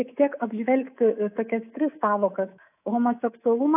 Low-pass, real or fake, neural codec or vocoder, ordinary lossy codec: 3.6 kHz; fake; codec, 24 kHz, 3.1 kbps, DualCodec; AAC, 32 kbps